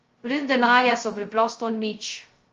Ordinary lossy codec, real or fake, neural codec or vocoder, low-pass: Opus, 32 kbps; fake; codec, 16 kHz, 0.2 kbps, FocalCodec; 7.2 kHz